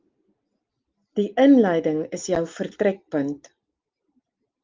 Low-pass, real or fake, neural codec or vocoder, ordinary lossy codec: 7.2 kHz; real; none; Opus, 32 kbps